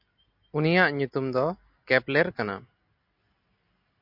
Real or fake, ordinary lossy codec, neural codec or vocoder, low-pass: real; MP3, 48 kbps; none; 5.4 kHz